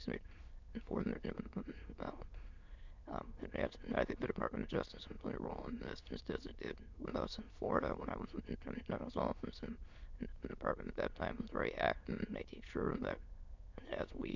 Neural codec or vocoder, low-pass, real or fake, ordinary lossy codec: autoencoder, 22.05 kHz, a latent of 192 numbers a frame, VITS, trained on many speakers; 7.2 kHz; fake; MP3, 64 kbps